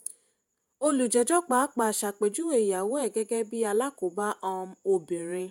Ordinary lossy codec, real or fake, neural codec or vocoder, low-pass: none; fake; vocoder, 48 kHz, 128 mel bands, Vocos; none